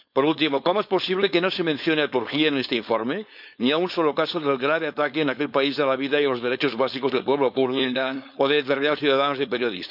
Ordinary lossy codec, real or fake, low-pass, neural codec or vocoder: none; fake; 5.4 kHz; codec, 16 kHz, 4.8 kbps, FACodec